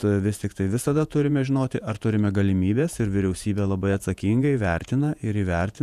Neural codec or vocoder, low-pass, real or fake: vocoder, 48 kHz, 128 mel bands, Vocos; 14.4 kHz; fake